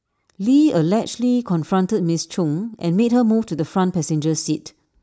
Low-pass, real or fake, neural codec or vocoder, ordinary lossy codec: none; real; none; none